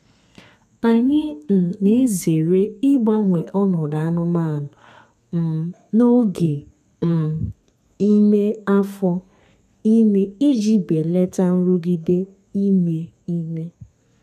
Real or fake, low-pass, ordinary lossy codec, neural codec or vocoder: fake; 14.4 kHz; none; codec, 32 kHz, 1.9 kbps, SNAC